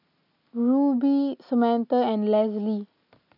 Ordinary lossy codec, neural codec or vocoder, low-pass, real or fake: none; none; 5.4 kHz; real